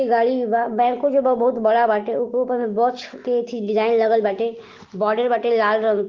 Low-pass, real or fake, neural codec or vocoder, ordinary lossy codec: 7.2 kHz; real; none; Opus, 16 kbps